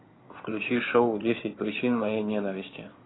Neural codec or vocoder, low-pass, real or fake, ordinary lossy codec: codec, 16 kHz in and 24 kHz out, 2.2 kbps, FireRedTTS-2 codec; 7.2 kHz; fake; AAC, 16 kbps